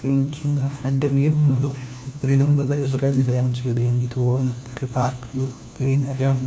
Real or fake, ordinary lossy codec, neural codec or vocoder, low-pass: fake; none; codec, 16 kHz, 1 kbps, FunCodec, trained on LibriTTS, 50 frames a second; none